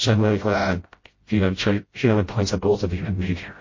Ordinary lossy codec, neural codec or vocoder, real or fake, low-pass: MP3, 32 kbps; codec, 16 kHz, 0.5 kbps, FreqCodec, smaller model; fake; 7.2 kHz